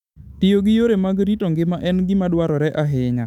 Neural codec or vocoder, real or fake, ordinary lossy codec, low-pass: autoencoder, 48 kHz, 128 numbers a frame, DAC-VAE, trained on Japanese speech; fake; none; 19.8 kHz